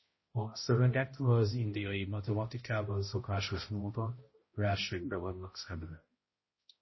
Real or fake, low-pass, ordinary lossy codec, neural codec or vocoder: fake; 7.2 kHz; MP3, 24 kbps; codec, 16 kHz, 0.5 kbps, X-Codec, HuBERT features, trained on balanced general audio